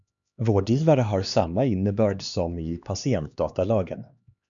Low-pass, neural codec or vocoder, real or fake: 7.2 kHz; codec, 16 kHz, 2 kbps, X-Codec, HuBERT features, trained on LibriSpeech; fake